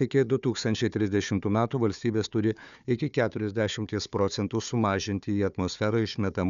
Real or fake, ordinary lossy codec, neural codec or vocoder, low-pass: fake; MP3, 96 kbps; codec, 16 kHz, 4 kbps, FunCodec, trained on Chinese and English, 50 frames a second; 7.2 kHz